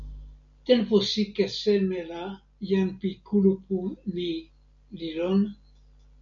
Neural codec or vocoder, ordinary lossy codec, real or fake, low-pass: none; MP3, 64 kbps; real; 7.2 kHz